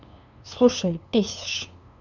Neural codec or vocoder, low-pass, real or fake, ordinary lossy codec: codec, 16 kHz, 2 kbps, FunCodec, trained on LibriTTS, 25 frames a second; 7.2 kHz; fake; none